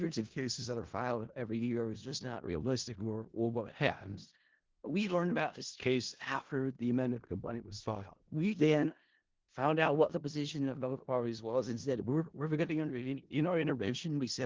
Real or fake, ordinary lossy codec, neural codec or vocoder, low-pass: fake; Opus, 16 kbps; codec, 16 kHz in and 24 kHz out, 0.4 kbps, LongCat-Audio-Codec, four codebook decoder; 7.2 kHz